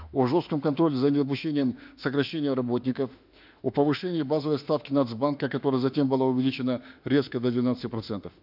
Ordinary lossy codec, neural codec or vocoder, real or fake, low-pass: none; autoencoder, 48 kHz, 32 numbers a frame, DAC-VAE, trained on Japanese speech; fake; 5.4 kHz